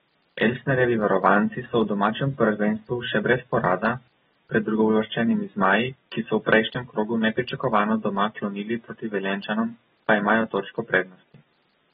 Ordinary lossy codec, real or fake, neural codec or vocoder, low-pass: AAC, 16 kbps; real; none; 9.9 kHz